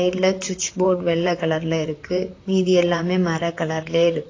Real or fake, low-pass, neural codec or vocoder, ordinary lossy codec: fake; 7.2 kHz; vocoder, 44.1 kHz, 128 mel bands, Pupu-Vocoder; AAC, 32 kbps